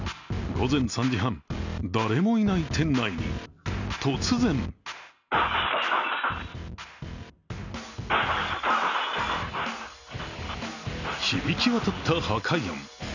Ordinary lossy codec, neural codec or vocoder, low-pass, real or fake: none; none; 7.2 kHz; real